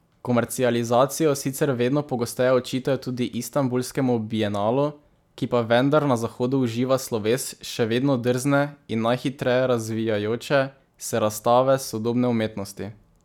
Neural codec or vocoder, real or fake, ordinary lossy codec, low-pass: none; real; none; 19.8 kHz